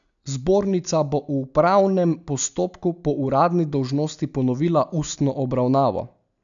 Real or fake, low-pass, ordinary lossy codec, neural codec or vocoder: real; 7.2 kHz; none; none